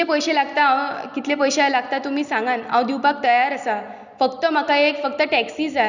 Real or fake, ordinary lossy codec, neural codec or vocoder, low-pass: real; none; none; 7.2 kHz